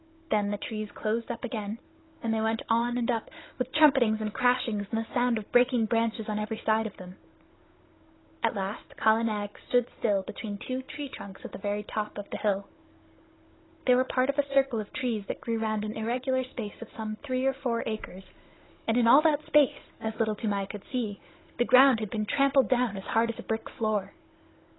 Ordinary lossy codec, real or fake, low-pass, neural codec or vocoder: AAC, 16 kbps; fake; 7.2 kHz; codec, 16 kHz, 16 kbps, FunCodec, trained on Chinese and English, 50 frames a second